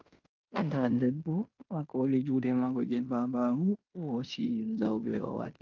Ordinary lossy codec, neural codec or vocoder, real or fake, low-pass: Opus, 24 kbps; codec, 16 kHz in and 24 kHz out, 0.9 kbps, LongCat-Audio-Codec, fine tuned four codebook decoder; fake; 7.2 kHz